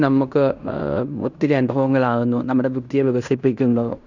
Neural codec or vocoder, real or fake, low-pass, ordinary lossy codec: codec, 16 kHz in and 24 kHz out, 0.9 kbps, LongCat-Audio-Codec, fine tuned four codebook decoder; fake; 7.2 kHz; none